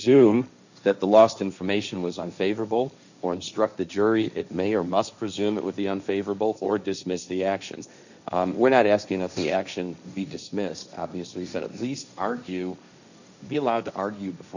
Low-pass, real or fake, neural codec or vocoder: 7.2 kHz; fake; codec, 16 kHz, 1.1 kbps, Voila-Tokenizer